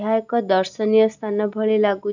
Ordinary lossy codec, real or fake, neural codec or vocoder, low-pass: none; real; none; 7.2 kHz